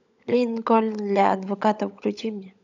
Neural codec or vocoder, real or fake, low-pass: codec, 16 kHz, 16 kbps, FunCodec, trained on LibriTTS, 50 frames a second; fake; 7.2 kHz